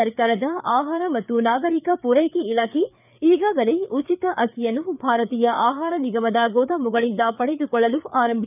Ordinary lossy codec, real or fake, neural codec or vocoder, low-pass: none; fake; codec, 16 kHz, 4 kbps, FreqCodec, larger model; 3.6 kHz